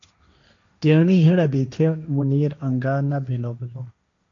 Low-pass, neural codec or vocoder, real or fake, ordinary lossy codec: 7.2 kHz; codec, 16 kHz, 1.1 kbps, Voila-Tokenizer; fake; AAC, 48 kbps